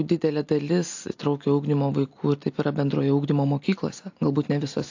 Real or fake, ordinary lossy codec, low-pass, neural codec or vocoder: real; AAC, 48 kbps; 7.2 kHz; none